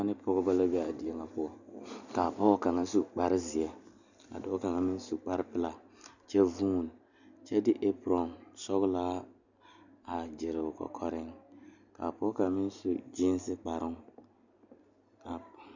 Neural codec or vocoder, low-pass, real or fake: none; 7.2 kHz; real